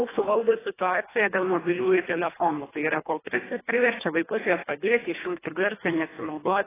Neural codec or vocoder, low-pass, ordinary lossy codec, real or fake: codec, 24 kHz, 1.5 kbps, HILCodec; 3.6 kHz; AAC, 16 kbps; fake